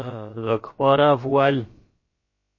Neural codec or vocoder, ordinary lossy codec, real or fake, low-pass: codec, 16 kHz, about 1 kbps, DyCAST, with the encoder's durations; MP3, 32 kbps; fake; 7.2 kHz